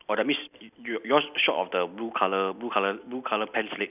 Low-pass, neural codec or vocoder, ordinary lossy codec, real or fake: 3.6 kHz; none; none; real